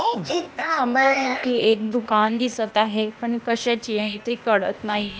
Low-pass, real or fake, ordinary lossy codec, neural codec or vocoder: none; fake; none; codec, 16 kHz, 0.8 kbps, ZipCodec